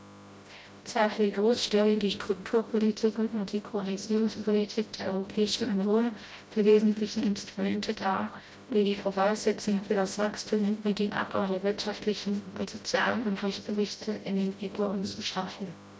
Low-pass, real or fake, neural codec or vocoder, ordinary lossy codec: none; fake; codec, 16 kHz, 0.5 kbps, FreqCodec, smaller model; none